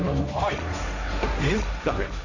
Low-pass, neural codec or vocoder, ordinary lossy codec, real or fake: 7.2 kHz; codec, 16 kHz, 1.1 kbps, Voila-Tokenizer; none; fake